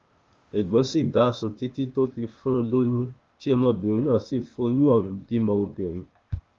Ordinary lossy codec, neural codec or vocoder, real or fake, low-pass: Opus, 32 kbps; codec, 16 kHz, 0.8 kbps, ZipCodec; fake; 7.2 kHz